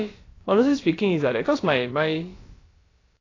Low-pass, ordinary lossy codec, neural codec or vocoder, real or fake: 7.2 kHz; AAC, 32 kbps; codec, 16 kHz, about 1 kbps, DyCAST, with the encoder's durations; fake